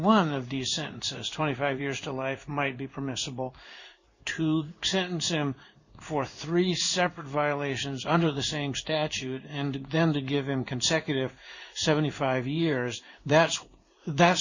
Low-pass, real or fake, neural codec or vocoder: 7.2 kHz; fake; codec, 16 kHz in and 24 kHz out, 1 kbps, XY-Tokenizer